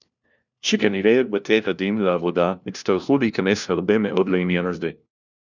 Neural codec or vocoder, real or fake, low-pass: codec, 16 kHz, 1 kbps, FunCodec, trained on LibriTTS, 50 frames a second; fake; 7.2 kHz